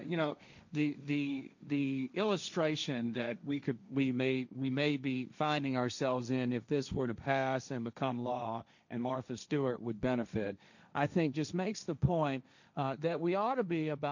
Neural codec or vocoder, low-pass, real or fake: codec, 16 kHz, 1.1 kbps, Voila-Tokenizer; 7.2 kHz; fake